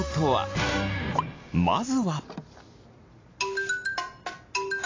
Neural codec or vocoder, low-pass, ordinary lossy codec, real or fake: none; 7.2 kHz; AAC, 32 kbps; real